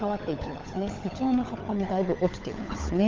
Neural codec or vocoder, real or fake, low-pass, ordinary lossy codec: codec, 16 kHz, 16 kbps, FunCodec, trained on LibriTTS, 50 frames a second; fake; 7.2 kHz; Opus, 32 kbps